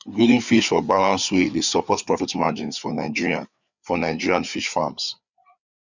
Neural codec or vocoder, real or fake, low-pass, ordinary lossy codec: codec, 16 kHz, 4 kbps, FreqCodec, larger model; fake; 7.2 kHz; none